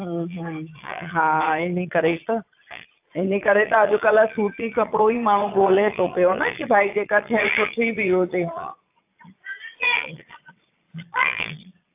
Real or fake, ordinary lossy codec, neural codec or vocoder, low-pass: fake; AAC, 32 kbps; vocoder, 22.05 kHz, 80 mel bands, Vocos; 3.6 kHz